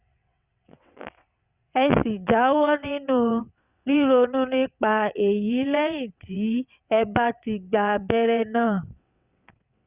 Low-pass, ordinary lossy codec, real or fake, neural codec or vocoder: 3.6 kHz; Opus, 64 kbps; fake; vocoder, 22.05 kHz, 80 mel bands, WaveNeXt